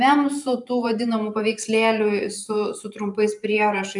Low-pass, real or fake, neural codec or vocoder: 10.8 kHz; fake; vocoder, 44.1 kHz, 128 mel bands every 512 samples, BigVGAN v2